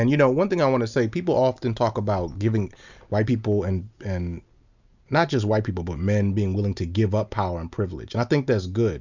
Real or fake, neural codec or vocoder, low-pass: real; none; 7.2 kHz